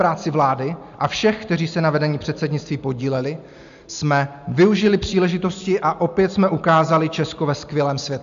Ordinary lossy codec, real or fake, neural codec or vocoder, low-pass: MP3, 64 kbps; real; none; 7.2 kHz